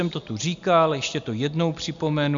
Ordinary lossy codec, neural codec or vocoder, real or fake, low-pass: MP3, 64 kbps; none; real; 7.2 kHz